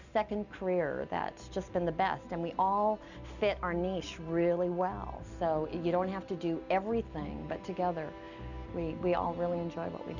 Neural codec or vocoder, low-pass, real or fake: none; 7.2 kHz; real